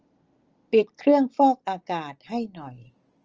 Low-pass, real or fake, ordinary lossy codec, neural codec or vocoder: 7.2 kHz; real; Opus, 24 kbps; none